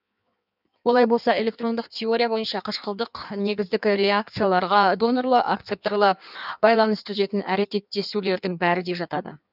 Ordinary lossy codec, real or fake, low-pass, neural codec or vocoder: none; fake; 5.4 kHz; codec, 16 kHz in and 24 kHz out, 1.1 kbps, FireRedTTS-2 codec